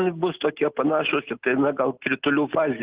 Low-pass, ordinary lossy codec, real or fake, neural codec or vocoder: 3.6 kHz; Opus, 16 kbps; real; none